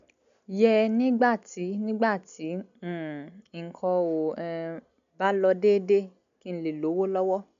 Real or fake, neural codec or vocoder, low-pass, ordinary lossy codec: real; none; 7.2 kHz; none